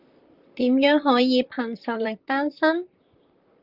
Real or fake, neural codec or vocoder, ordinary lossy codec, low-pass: fake; vocoder, 44.1 kHz, 128 mel bands, Pupu-Vocoder; Opus, 24 kbps; 5.4 kHz